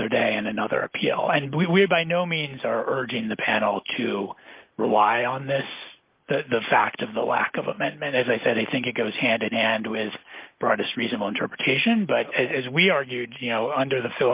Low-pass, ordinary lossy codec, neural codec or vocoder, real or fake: 3.6 kHz; Opus, 32 kbps; none; real